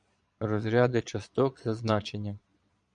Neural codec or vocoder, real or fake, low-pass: vocoder, 22.05 kHz, 80 mel bands, Vocos; fake; 9.9 kHz